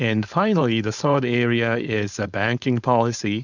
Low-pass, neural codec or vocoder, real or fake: 7.2 kHz; codec, 16 kHz, 4.8 kbps, FACodec; fake